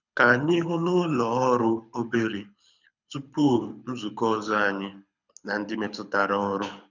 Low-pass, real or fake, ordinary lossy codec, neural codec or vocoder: 7.2 kHz; fake; none; codec, 24 kHz, 6 kbps, HILCodec